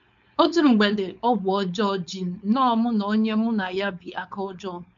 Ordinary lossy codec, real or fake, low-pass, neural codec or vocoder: AAC, 96 kbps; fake; 7.2 kHz; codec, 16 kHz, 4.8 kbps, FACodec